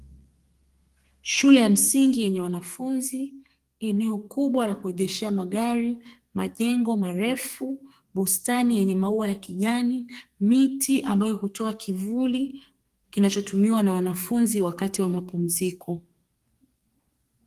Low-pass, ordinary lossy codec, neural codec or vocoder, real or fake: 14.4 kHz; Opus, 24 kbps; codec, 32 kHz, 1.9 kbps, SNAC; fake